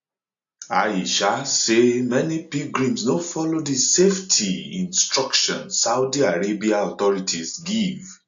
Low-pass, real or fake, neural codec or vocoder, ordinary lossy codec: 7.2 kHz; real; none; AAC, 64 kbps